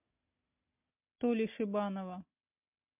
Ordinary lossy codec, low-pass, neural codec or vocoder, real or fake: MP3, 32 kbps; 3.6 kHz; none; real